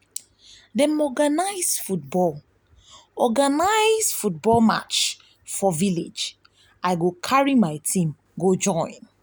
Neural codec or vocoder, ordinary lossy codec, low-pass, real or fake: none; none; none; real